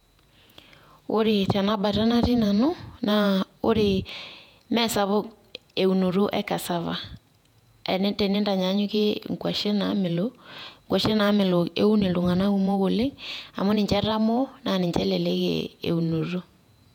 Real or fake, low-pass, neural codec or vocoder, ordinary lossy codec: fake; 19.8 kHz; vocoder, 48 kHz, 128 mel bands, Vocos; none